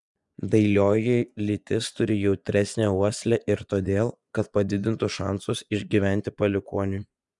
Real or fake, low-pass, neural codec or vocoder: fake; 10.8 kHz; vocoder, 24 kHz, 100 mel bands, Vocos